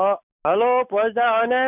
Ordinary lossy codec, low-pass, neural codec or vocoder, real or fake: none; 3.6 kHz; none; real